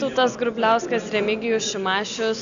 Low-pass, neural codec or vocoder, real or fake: 7.2 kHz; none; real